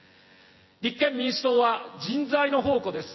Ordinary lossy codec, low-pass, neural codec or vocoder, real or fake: MP3, 24 kbps; 7.2 kHz; vocoder, 24 kHz, 100 mel bands, Vocos; fake